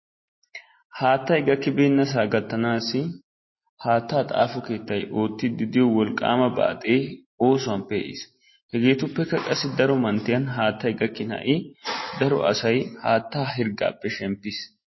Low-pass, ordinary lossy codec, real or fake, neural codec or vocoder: 7.2 kHz; MP3, 24 kbps; real; none